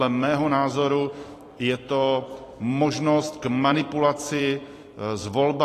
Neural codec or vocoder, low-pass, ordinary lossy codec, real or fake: vocoder, 44.1 kHz, 128 mel bands every 256 samples, BigVGAN v2; 14.4 kHz; AAC, 48 kbps; fake